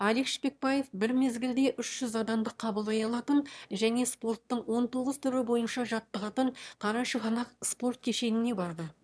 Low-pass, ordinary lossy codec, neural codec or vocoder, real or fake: none; none; autoencoder, 22.05 kHz, a latent of 192 numbers a frame, VITS, trained on one speaker; fake